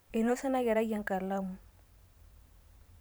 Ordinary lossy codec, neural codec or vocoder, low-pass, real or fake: none; vocoder, 44.1 kHz, 128 mel bands every 512 samples, BigVGAN v2; none; fake